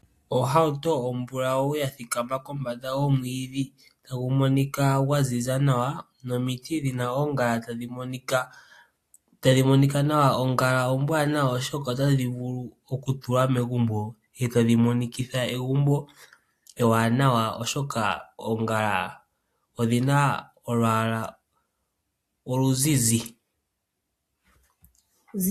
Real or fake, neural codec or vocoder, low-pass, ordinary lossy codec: real; none; 14.4 kHz; AAC, 64 kbps